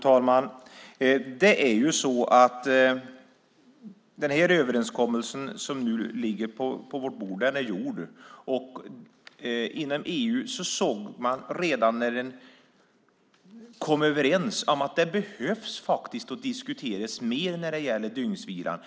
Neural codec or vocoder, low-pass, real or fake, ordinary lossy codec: none; none; real; none